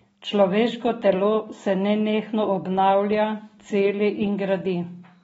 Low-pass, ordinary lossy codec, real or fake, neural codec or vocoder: 19.8 kHz; AAC, 24 kbps; real; none